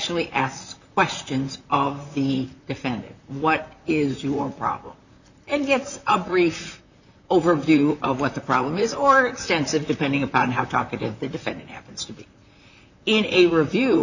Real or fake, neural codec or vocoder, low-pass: fake; vocoder, 44.1 kHz, 128 mel bands, Pupu-Vocoder; 7.2 kHz